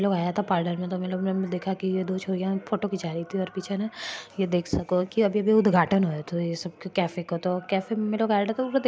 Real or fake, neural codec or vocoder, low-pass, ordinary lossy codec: real; none; none; none